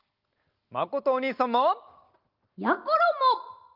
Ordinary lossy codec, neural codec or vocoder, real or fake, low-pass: Opus, 32 kbps; none; real; 5.4 kHz